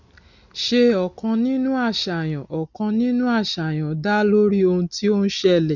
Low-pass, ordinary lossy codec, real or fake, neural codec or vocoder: 7.2 kHz; none; real; none